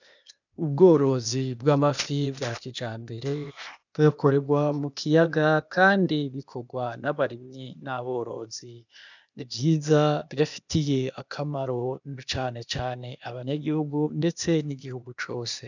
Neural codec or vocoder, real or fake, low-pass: codec, 16 kHz, 0.8 kbps, ZipCodec; fake; 7.2 kHz